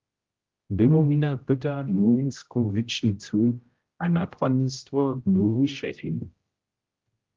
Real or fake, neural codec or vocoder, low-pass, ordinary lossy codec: fake; codec, 16 kHz, 0.5 kbps, X-Codec, HuBERT features, trained on general audio; 7.2 kHz; Opus, 32 kbps